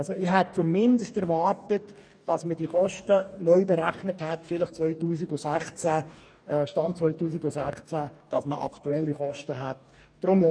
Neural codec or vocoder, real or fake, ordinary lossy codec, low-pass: codec, 44.1 kHz, 2.6 kbps, DAC; fake; none; 9.9 kHz